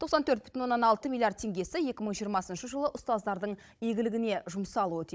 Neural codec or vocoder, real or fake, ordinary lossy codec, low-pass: codec, 16 kHz, 16 kbps, FunCodec, trained on Chinese and English, 50 frames a second; fake; none; none